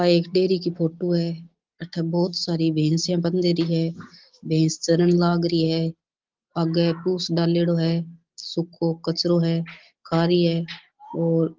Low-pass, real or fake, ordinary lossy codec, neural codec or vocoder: 7.2 kHz; real; Opus, 16 kbps; none